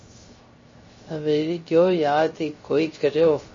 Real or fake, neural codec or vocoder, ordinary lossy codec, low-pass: fake; codec, 16 kHz, 0.3 kbps, FocalCodec; MP3, 32 kbps; 7.2 kHz